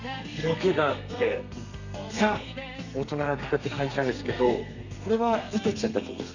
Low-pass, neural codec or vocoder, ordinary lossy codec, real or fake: 7.2 kHz; codec, 32 kHz, 1.9 kbps, SNAC; none; fake